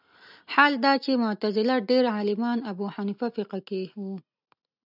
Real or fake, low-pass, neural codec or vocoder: real; 5.4 kHz; none